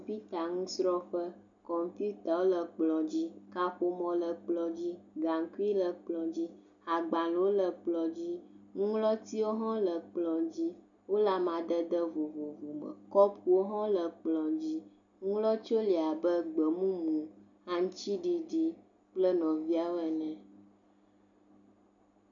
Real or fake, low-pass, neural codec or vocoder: real; 7.2 kHz; none